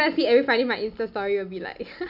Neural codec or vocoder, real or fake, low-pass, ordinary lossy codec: none; real; 5.4 kHz; none